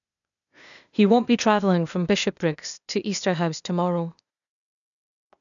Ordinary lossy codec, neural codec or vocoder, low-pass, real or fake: none; codec, 16 kHz, 0.8 kbps, ZipCodec; 7.2 kHz; fake